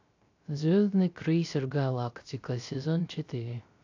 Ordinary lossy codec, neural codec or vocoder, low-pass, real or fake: AAC, 48 kbps; codec, 16 kHz, 0.3 kbps, FocalCodec; 7.2 kHz; fake